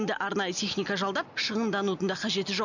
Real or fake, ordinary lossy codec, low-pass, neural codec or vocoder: real; none; 7.2 kHz; none